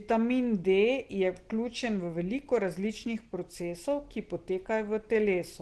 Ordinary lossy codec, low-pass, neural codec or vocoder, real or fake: Opus, 16 kbps; 10.8 kHz; none; real